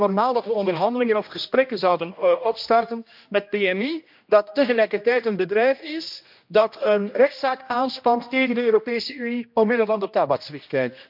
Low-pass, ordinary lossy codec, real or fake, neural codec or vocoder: 5.4 kHz; MP3, 48 kbps; fake; codec, 16 kHz, 1 kbps, X-Codec, HuBERT features, trained on general audio